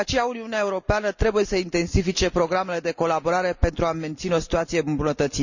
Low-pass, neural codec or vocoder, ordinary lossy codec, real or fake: 7.2 kHz; none; none; real